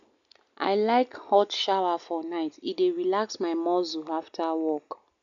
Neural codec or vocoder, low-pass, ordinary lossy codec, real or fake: none; 7.2 kHz; none; real